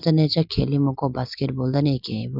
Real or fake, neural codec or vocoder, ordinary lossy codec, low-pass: real; none; none; 5.4 kHz